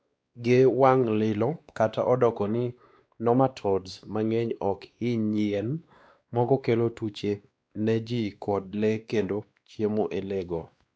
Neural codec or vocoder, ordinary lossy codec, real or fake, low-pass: codec, 16 kHz, 2 kbps, X-Codec, WavLM features, trained on Multilingual LibriSpeech; none; fake; none